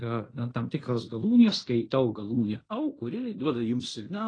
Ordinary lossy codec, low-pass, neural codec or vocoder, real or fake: AAC, 32 kbps; 9.9 kHz; codec, 16 kHz in and 24 kHz out, 0.9 kbps, LongCat-Audio-Codec, fine tuned four codebook decoder; fake